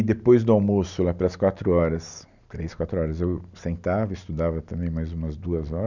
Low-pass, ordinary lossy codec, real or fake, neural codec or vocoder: 7.2 kHz; none; real; none